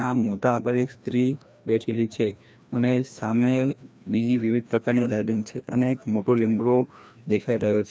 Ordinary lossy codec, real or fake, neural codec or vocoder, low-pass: none; fake; codec, 16 kHz, 1 kbps, FreqCodec, larger model; none